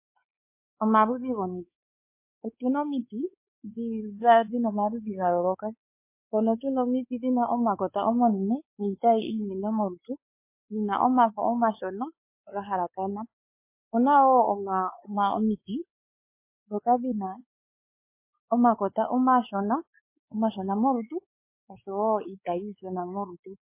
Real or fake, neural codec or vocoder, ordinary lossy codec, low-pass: fake; codec, 16 kHz, 4 kbps, X-Codec, WavLM features, trained on Multilingual LibriSpeech; MP3, 24 kbps; 3.6 kHz